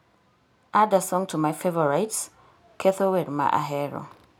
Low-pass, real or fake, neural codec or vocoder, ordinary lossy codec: none; real; none; none